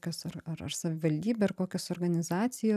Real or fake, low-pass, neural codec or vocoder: real; 14.4 kHz; none